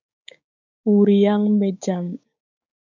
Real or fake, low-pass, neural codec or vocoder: fake; 7.2 kHz; codec, 16 kHz, 6 kbps, DAC